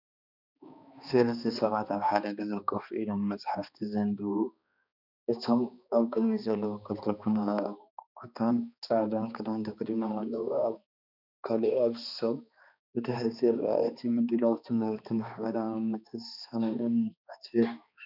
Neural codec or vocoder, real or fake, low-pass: codec, 16 kHz, 2 kbps, X-Codec, HuBERT features, trained on balanced general audio; fake; 5.4 kHz